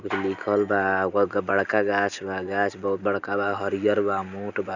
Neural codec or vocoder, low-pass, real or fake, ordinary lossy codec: none; 7.2 kHz; real; none